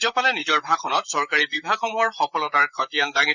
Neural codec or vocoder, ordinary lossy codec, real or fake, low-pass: vocoder, 44.1 kHz, 128 mel bands, Pupu-Vocoder; none; fake; 7.2 kHz